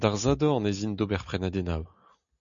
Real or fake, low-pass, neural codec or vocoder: real; 7.2 kHz; none